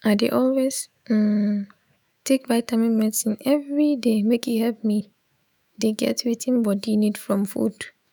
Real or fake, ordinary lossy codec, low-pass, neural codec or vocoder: fake; none; none; autoencoder, 48 kHz, 128 numbers a frame, DAC-VAE, trained on Japanese speech